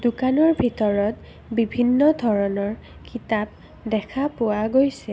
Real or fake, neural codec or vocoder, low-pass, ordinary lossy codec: real; none; none; none